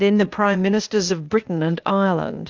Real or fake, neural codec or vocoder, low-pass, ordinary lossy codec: fake; codec, 16 kHz, 0.8 kbps, ZipCodec; 7.2 kHz; Opus, 32 kbps